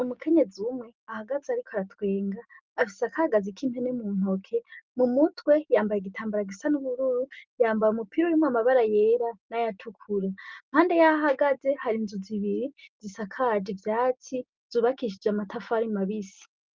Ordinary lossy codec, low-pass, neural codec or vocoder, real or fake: Opus, 24 kbps; 7.2 kHz; none; real